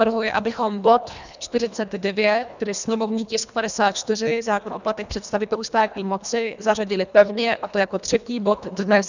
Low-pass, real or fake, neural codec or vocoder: 7.2 kHz; fake; codec, 24 kHz, 1.5 kbps, HILCodec